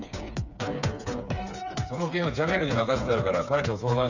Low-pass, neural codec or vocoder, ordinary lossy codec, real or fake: 7.2 kHz; codec, 16 kHz, 4 kbps, FreqCodec, smaller model; none; fake